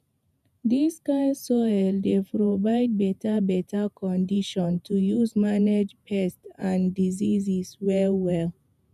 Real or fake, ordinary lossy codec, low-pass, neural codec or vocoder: fake; none; 14.4 kHz; vocoder, 48 kHz, 128 mel bands, Vocos